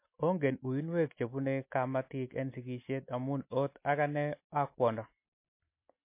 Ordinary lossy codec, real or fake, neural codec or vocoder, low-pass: MP3, 24 kbps; real; none; 3.6 kHz